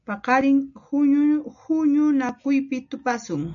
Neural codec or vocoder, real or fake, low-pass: none; real; 7.2 kHz